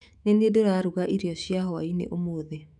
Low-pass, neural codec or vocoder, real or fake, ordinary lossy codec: 10.8 kHz; autoencoder, 48 kHz, 128 numbers a frame, DAC-VAE, trained on Japanese speech; fake; none